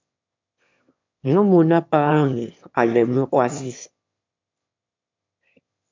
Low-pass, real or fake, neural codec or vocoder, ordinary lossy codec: 7.2 kHz; fake; autoencoder, 22.05 kHz, a latent of 192 numbers a frame, VITS, trained on one speaker; MP3, 64 kbps